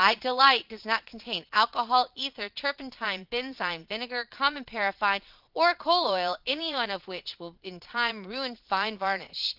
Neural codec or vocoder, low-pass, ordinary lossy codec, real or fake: vocoder, 22.05 kHz, 80 mel bands, Vocos; 5.4 kHz; Opus, 24 kbps; fake